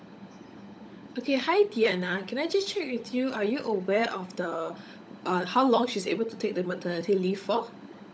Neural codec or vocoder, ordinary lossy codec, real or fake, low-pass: codec, 16 kHz, 16 kbps, FunCodec, trained on LibriTTS, 50 frames a second; none; fake; none